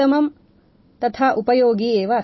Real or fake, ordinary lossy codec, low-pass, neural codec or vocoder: real; MP3, 24 kbps; 7.2 kHz; none